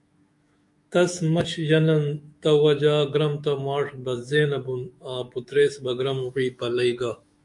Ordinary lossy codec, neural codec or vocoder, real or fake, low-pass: MP3, 64 kbps; autoencoder, 48 kHz, 128 numbers a frame, DAC-VAE, trained on Japanese speech; fake; 10.8 kHz